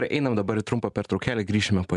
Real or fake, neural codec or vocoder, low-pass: real; none; 10.8 kHz